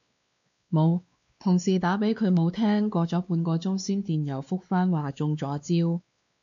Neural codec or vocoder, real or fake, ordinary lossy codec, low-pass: codec, 16 kHz, 2 kbps, X-Codec, WavLM features, trained on Multilingual LibriSpeech; fake; MP3, 64 kbps; 7.2 kHz